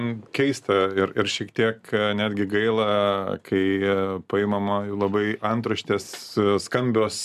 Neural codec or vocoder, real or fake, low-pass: none; real; 14.4 kHz